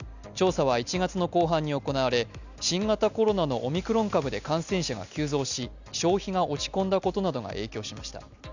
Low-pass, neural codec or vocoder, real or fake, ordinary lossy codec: 7.2 kHz; none; real; none